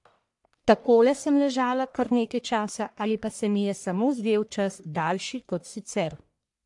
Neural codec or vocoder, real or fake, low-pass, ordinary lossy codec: codec, 44.1 kHz, 1.7 kbps, Pupu-Codec; fake; 10.8 kHz; AAC, 64 kbps